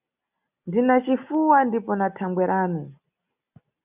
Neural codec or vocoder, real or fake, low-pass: none; real; 3.6 kHz